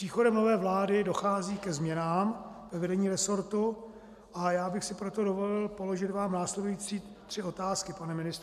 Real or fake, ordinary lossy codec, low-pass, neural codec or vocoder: real; MP3, 96 kbps; 14.4 kHz; none